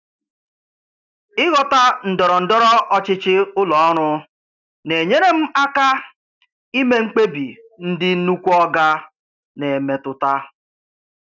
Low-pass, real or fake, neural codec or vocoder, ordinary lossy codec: 7.2 kHz; real; none; none